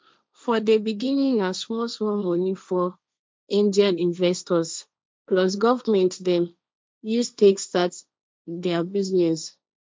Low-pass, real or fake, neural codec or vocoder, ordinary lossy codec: none; fake; codec, 16 kHz, 1.1 kbps, Voila-Tokenizer; none